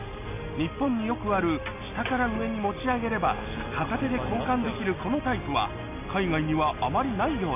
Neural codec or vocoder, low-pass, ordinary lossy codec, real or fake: none; 3.6 kHz; none; real